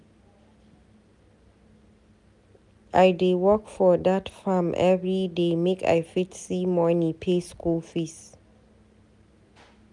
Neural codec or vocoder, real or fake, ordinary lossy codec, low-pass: none; real; none; 10.8 kHz